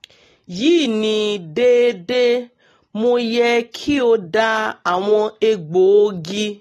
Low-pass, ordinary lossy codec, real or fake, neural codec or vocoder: 19.8 kHz; AAC, 32 kbps; real; none